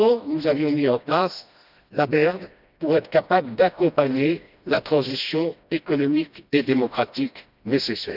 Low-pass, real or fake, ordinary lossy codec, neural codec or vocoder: 5.4 kHz; fake; none; codec, 16 kHz, 1 kbps, FreqCodec, smaller model